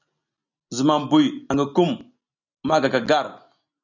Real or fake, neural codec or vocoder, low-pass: real; none; 7.2 kHz